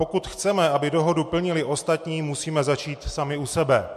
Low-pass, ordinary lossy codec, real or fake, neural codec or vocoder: 14.4 kHz; MP3, 64 kbps; real; none